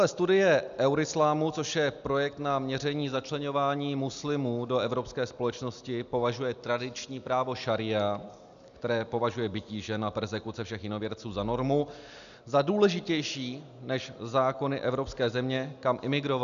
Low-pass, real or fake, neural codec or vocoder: 7.2 kHz; real; none